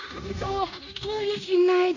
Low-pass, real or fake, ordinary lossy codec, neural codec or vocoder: 7.2 kHz; fake; AAC, 48 kbps; codec, 16 kHz in and 24 kHz out, 0.9 kbps, LongCat-Audio-Codec, fine tuned four codebook decoder